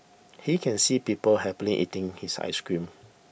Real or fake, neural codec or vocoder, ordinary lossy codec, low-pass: real; none; none; none